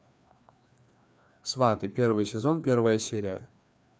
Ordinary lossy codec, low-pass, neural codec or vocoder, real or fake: none; none; codec, 16 kHz, 2 kbps, FreqCodec, larger model; fake